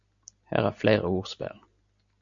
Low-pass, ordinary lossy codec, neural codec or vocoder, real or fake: 7.2 kHz; MP3, 48 kbps; none; real